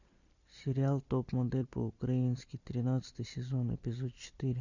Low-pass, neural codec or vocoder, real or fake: 7.2 kHz; none; real